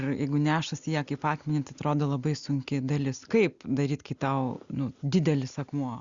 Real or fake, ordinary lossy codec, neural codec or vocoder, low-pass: real; Opus, 64 kbps; none; 7.2 kHz